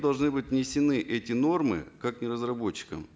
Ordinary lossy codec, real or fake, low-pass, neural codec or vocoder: none; real; none; none